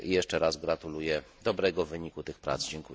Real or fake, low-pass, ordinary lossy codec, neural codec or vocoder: real; none; none; none